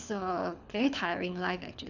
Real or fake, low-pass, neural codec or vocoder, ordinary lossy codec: fake; 7.2 kHz; codec, 24 kHz, 6 kbps, HILCodec; none